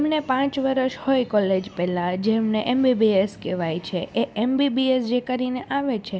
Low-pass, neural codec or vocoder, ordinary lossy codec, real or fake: none; none; none; real